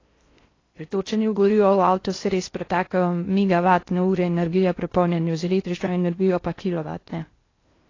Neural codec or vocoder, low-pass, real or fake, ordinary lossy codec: codec, 16 kHz in and 24 kHz out, 0.6 kbps, FocalCodec, streaming, 4096 codes; 7.2 kHz; fake; AAC, 32 kbps